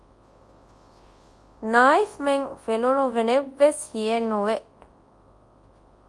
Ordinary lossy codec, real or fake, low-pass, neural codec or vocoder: Opus, 32 kbps; fake; 10.8 kHz; codec, 24 kHz, 0.9 kbps, WavTokenizer, large speech release